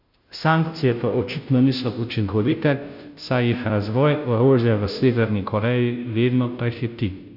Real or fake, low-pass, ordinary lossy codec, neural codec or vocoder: fake; 5.4 kHz; none; codec, 16 kHz, 0.5 kbps, FunCodec, trained on Chinese and English, 25 frames a second